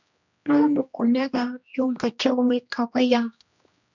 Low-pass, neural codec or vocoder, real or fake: 7.2 kHz; codec, 16 kHz, 1 kbps, X-Codec, HuBERT features, trained on general audio; fake